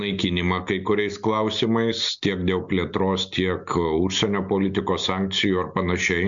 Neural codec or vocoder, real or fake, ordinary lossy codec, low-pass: none; real; MP3, 64 kbps; 7.2 kHz